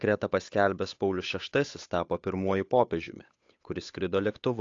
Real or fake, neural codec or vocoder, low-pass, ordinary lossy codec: fake; codec, 16 kHz, 8 kbps, FunCodec, trained on Chinese and English, 25 frames a second; 7.2 kHz; AAC, 48 kbps